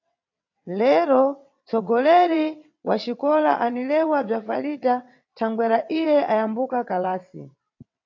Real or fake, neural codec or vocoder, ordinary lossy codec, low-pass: fake; vocoder, 22.05 kHz, 80 mel bands, WaveNeXt; AAC, 48 kbps; 7.2 kHz